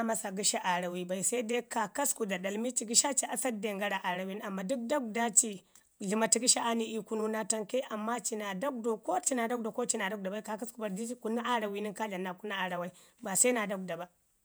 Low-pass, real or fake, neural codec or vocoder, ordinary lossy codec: none; fake; vocoder, 48 kHz, 128 mel bands, Vocos; none